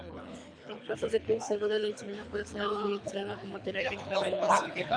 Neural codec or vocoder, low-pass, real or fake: codec, 24 kHz, 3 kbps, HILCodec; 9.9 kHz; fake